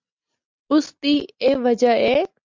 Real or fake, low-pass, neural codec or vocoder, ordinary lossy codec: real; 7.2 kHz; none; MP3, 64 kbps